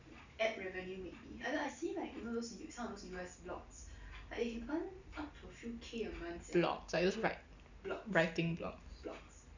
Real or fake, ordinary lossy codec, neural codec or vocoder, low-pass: real; none; none; 7.2 kHz